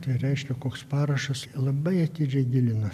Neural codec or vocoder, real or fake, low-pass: none; real; 14.4 kHz